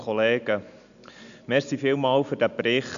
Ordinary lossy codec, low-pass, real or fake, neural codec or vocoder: none; 7.2 kHz; real; none